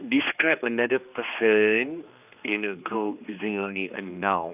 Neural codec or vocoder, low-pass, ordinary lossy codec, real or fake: codec, 16 kHz, 2 kbps, X-Codec, HuBERT features, trained on general audio; 3.6 kHz; none; fake